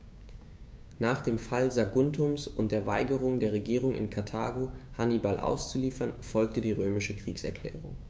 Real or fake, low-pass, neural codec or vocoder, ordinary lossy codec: fake; none; codec, 16 kHz, 6 kbps, DAC; none